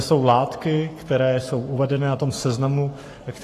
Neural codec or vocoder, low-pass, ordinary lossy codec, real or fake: codec, 44.1 kHz, 7.8 kbps, Pupu-Codec; 14.4 kHz; AAC, 48 kbps; fake